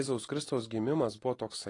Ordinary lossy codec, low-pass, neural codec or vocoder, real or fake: AAC, 32 kbps; 10.8 kHz; none; real